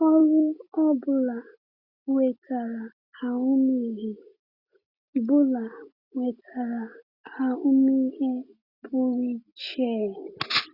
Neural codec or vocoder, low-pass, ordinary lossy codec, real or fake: none; 5.4 kHz; Opus, 64 kbps; real